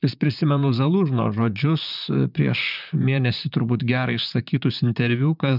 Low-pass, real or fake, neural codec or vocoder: 5.4 kHz; fake; vocoder, 44.1 kHz, 128 mel bands, Pupu-Vocoder